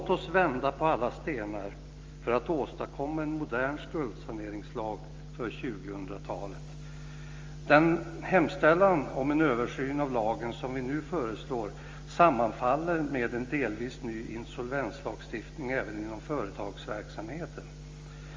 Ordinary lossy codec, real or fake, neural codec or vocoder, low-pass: Opus, 32 kbps; real; none; 7.2 kHz